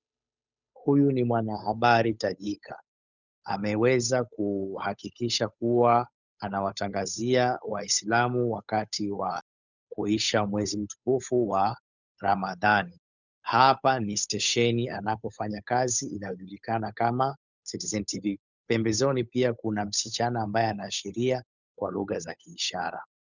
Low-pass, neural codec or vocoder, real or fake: 7.2 kHz; codec, 16 kHz, 8 kbps, FunCodec, trained on Chinese and English, 25 frames a second; fake